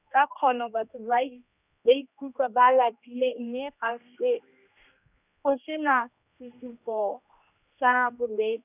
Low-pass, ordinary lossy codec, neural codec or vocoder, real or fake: 3.6 kHz; none; codec, 16 kHz, 1 kbps, X-Codec, HuBERT features, trained on general audio; fake